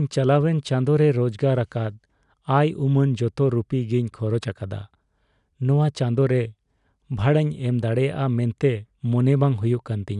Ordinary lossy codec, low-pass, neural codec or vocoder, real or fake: none; 10.8 kHz; none; real